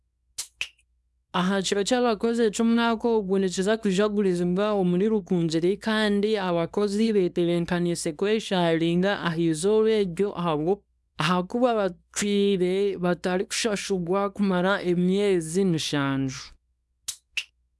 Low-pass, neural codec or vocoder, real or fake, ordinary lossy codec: none; codec, 24 kHz, 0.9 kbps, WavTokenizer, small release; fake; none